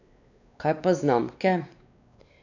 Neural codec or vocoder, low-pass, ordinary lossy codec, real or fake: codec, 16 kHz, 2 kbps, X-Codec, WavLM features, trained on Multilingual LibriSpeech; 7.2 kHz; none; fake